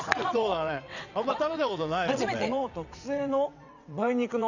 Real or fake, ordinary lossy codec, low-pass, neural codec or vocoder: fake; none; 7.2 kHz; vocoder, 22.05 kHz, 80 mel bands, WaveNeXt